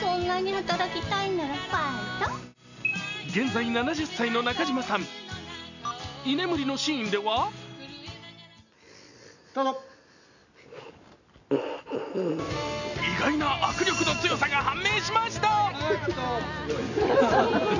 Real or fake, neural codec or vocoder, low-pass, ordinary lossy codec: real; none; 7.2 kHz; none